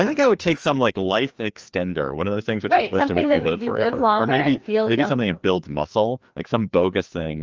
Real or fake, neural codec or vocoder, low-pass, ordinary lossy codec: fake; codec, 16 kHz, 2 kbps, FreqCodec, larger model; 7.2 kHz; Opus, 24 kbps